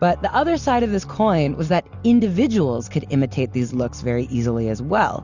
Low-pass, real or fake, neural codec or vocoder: 7.2 kHz; real; none